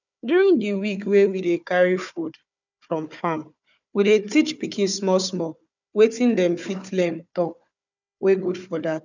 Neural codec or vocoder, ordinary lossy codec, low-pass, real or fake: codec, 16 kHz, 4 kbps, FunCodec, trained on Chinese and English, 50 frames a second; none; 7.2 kHz; fake